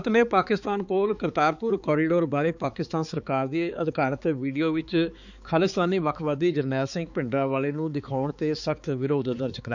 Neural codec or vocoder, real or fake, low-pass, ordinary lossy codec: codec, 16 kHz, 4 kbps, X-Codec, HuBERT features, trained on balanced general audio; fake; 7.2 kHz; none